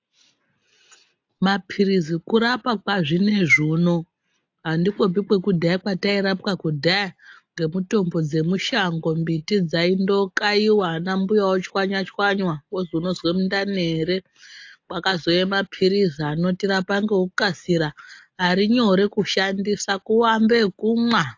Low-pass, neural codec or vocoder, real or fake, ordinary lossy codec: 7.2 kHz; none; real; AAC, 48 kbps